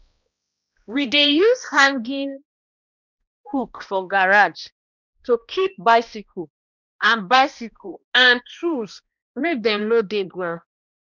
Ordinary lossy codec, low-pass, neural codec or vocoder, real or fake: none; 7.2 kHz; codec, 16 kHz, 1 kbps, X-Codec, HuBERT features, trained on balanced general audio; fake